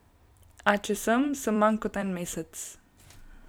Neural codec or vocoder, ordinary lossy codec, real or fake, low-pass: vocoder, 44.1 kHz, 128 mel bands every 256 samples, BigVGAN v2; none; fake; none